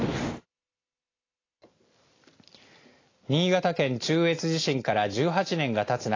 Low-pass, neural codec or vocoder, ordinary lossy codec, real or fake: 7.2 kHz; none; AAC, 32 kbps; real